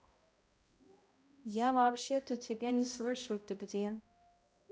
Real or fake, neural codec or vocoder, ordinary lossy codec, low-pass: fake; codec, 16 kHz, 0.5 kbps, X-Codec, HuBERT features, trained on balanced general audio; none; none